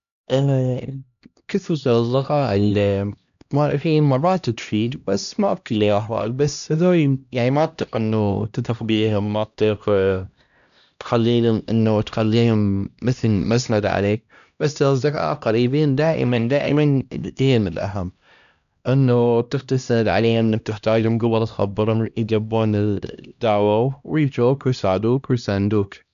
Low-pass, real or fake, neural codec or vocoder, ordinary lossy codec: 7.2 kHz; fake; codec, 16 kHz, 1 kbps, X-Codec, HuBERT features, trained on LibriSpeech; none